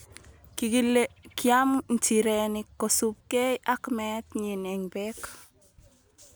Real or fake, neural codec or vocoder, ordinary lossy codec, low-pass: real; none; none; none